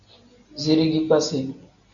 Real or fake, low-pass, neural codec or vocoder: real; 7.2 kHz; none